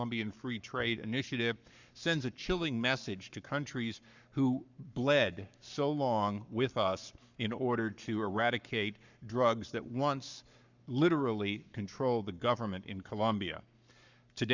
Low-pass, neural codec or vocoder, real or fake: 7.2 kHz; codec, 44.1 kHz, 7.8 kbps, Pupu-Codec; fake